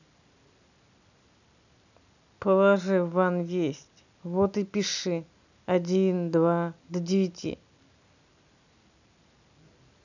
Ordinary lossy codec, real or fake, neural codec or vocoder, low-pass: none; real; none; 7.2 kHz